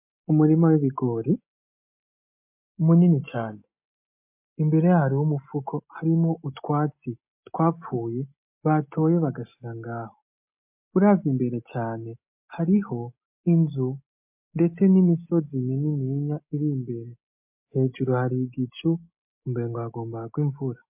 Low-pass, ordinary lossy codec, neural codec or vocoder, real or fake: 3.6 kHz; MP3, 32 kbps; none; real